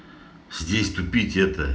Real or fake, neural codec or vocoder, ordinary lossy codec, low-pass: real; none; none; none